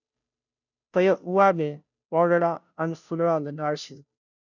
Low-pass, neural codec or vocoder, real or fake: 7.2 kHz; codec, 16 kHz, 0.5 kbps, FunCodec, trained on Chinese and English, 25 frames a second; fake